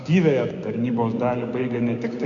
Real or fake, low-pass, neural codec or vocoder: fake; 7.2 kHz; codec, 16 kHz, 6 kbps, DAC